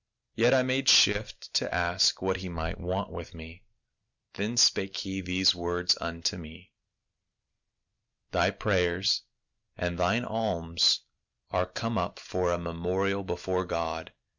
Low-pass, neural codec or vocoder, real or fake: 7.2 kHz; none; real